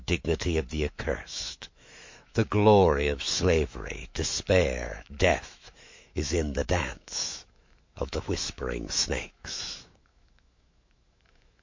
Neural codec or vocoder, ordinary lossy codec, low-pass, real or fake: autoencoder, 48 kHz, 128 numbers a frame, DAC-VAE, trained on Japanese speech; MP3, 48 kbps; 7.2 kHz; fake